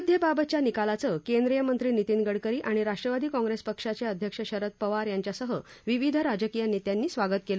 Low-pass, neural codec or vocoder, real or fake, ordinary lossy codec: none; none; real; none